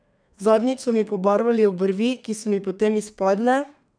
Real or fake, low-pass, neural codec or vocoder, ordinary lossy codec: fake; 9.9 kHz; codec, 32 kHz, 1.9 kbps, SNAC; none